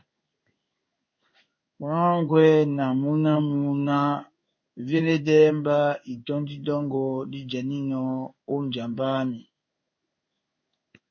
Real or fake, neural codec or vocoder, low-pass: fake; codec, 16 kHz in and 24 kHz out, 1 kbps, XY-Tokenizer; 7.2 kHz